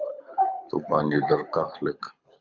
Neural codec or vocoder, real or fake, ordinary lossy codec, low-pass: codec, 24 kHz, 6 kbps, HILCodec; fake; Opus, 64 kbps; 7.2 kHz